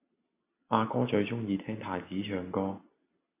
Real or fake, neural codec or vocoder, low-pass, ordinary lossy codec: real; none; 3.6 kHz; AAC, 24 kbps